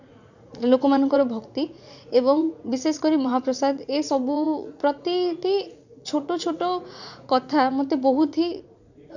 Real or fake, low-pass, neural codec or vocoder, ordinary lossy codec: fake; 7.2 kHz; vocoder, 44.1 kHz, 80 mel bands, Vocos; none